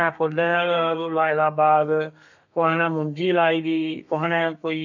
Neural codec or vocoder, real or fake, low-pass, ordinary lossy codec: codec, 32 kHz, 1.9 kbps, SNAC; fake; 7.2 kHz; none